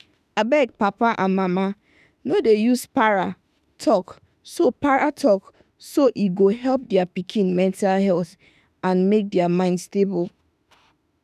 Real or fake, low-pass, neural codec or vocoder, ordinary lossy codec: fake; 14.4 kHz; autoencoder, 48 kHz, 32 numbers a frame, DAC-VAE, trained on Japanese speech; none